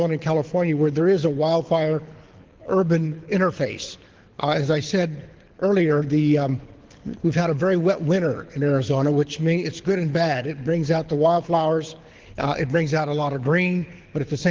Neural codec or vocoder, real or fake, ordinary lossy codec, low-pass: codec, 24 kHz, 6 kbps, HILCodec; fake; Opus, 16 kbps; 7.2 kHz